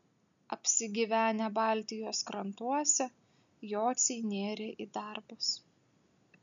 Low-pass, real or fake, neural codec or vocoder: 7.2 kHz; real; none